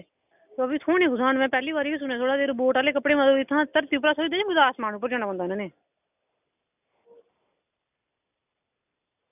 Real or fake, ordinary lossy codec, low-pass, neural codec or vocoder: real; none; 3.6 kHz; none